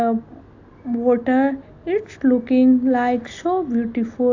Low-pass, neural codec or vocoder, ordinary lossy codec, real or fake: 7.2 kHz; none; AAC, 48 kbps; real